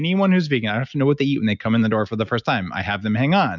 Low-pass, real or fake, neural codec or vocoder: 7.2 kHz; real; none